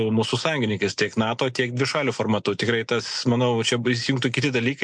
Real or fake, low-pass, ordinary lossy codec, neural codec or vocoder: real; 9.9 kHz; MP3, 64 kbps; none